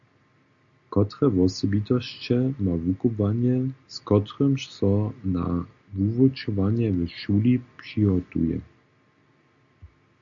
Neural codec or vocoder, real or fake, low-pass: none; real; 7.2 kHz